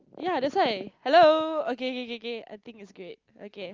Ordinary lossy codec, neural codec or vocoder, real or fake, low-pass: Opus, 24 kbps; none; real; 7.2 kHz